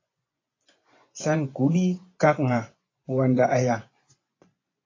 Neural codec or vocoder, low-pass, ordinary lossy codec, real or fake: vocoder, 24 kHz, 100 mel bands, Vocos; 7.2 kHz; AAC, 32 kbps; fake